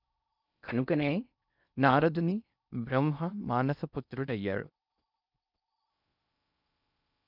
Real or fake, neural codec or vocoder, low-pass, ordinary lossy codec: fake; codec, 16 kHz in and 24 kHz out, 0.6 kbps, FocalCodec, streaming, 4096 codes; 5.4 kHz; none